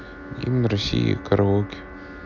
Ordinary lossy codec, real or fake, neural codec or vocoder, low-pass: none; real; none; 7.2 kHz